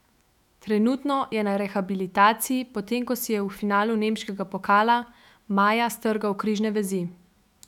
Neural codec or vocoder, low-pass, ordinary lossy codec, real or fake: autoencoder, 48 kHz, 128 numbers a frame, DAC-VAE, trained on Japanese speech; 19.8 kHz; none; fake